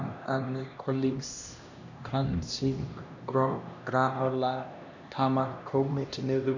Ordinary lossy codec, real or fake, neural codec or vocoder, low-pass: none; fake; codec, 16 kHz, 1 kbps, X-Codec, HuBERT features, trained on LibriSpeech; 7.2 kHz